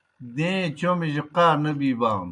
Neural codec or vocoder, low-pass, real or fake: none; 10.8 kHz; real